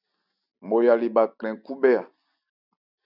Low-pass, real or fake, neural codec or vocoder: 5.4 kHz; real; none